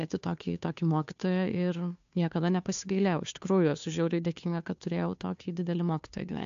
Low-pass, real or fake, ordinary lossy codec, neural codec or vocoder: 7.2 kHz; fake; AAC, 64 kbps; codec, 16 kHz, 2 kbps, FunCodec, trained on Chinese and English, 25 frames a second